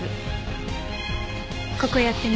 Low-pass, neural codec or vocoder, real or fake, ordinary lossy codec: none; none; real; none